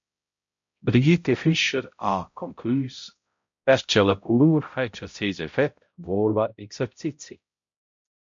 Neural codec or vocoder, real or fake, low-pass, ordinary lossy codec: codec, 16 kHz, 0.5 kbps, X-Codec, HuBERT features, trained on balanced general audio; fake; 7.2 kHz; MP3, 48 kbps